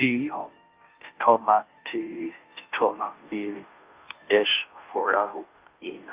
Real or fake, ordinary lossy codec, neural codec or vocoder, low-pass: fake; Opus, 24 kbps; codec, 16 kHz, 0.5 kbps, FunCodec, trained on Chinese and English, 25 frames a second; 3.6 kHz